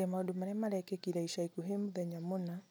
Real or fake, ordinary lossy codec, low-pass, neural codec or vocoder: real; none; none; none